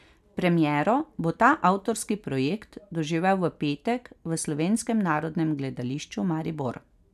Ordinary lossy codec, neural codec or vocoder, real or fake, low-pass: none; none; real; 14.4 kHz